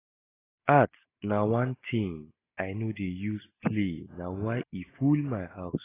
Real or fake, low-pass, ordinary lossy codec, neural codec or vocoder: real; 3.6 kHz; AAC, 16 kbps; none